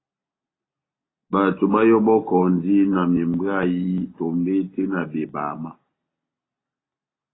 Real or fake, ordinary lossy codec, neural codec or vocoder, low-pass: real; AAC, 16 kbps; none; 7.2 kHz